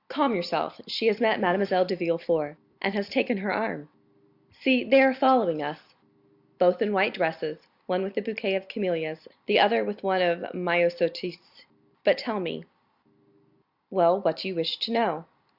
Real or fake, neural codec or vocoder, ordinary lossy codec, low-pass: real; none; Opus, 64 kbps; 5.4 kHz